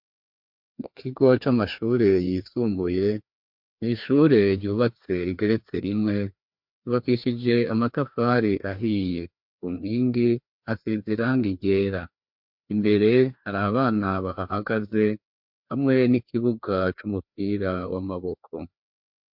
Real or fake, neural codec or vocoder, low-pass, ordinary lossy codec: fake; codec, 16 kHz, 2 kbps, FreqCodec, larger model; 5.4 kHz; MP3, 48 kbps